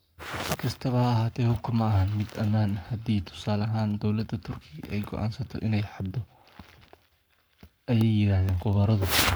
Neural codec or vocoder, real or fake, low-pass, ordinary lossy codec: codec, 44.1 kHz, 7.8 kbps, Pupu-Codec; fake; none; none